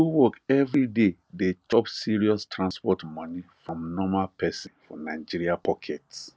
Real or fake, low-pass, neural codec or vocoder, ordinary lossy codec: real; none; none; none